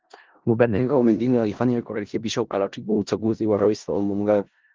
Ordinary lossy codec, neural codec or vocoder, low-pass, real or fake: Opus, 32 kbps; codec, 16 kHz in and 24 kHz out, 0.4 kbps, LongCat-Audio-Codec, four codebook decoder; 7.2 kHz; fake